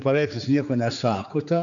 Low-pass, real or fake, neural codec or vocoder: 7.2 kHz; fake; codec, 16 kHz, 4 kbps, X-Codec, HuBERT features, trained on general audio